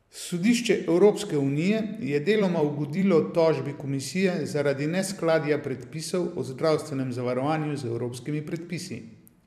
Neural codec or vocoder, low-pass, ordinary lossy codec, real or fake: vocoder, 44.1 kHz, 128 mel bands every 256 samples, BigVGAN v2; 14.4 kHz; MP3, 96 kbps; fake